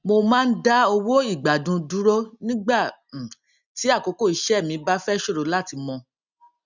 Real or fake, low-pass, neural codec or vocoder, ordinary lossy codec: real; 7.2 kHz; none; none